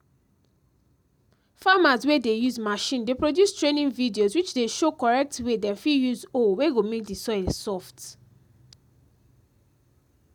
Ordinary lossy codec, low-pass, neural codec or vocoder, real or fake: none; 19.8 kHz; none; real